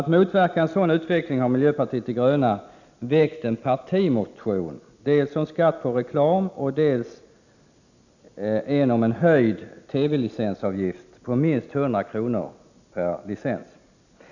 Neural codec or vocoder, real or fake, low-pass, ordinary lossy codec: none; real; 7.2 kHz; none